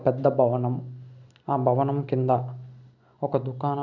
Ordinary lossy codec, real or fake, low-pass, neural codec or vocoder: none; real; 7.2 kHz; none